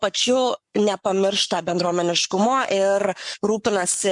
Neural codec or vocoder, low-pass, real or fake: codec, 44.1 kHz, 7.8 kbps, Pupu-Codec; 10.8 kHz; fake